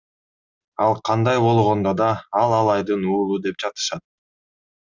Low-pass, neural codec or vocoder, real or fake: 7.2 kHz; none; real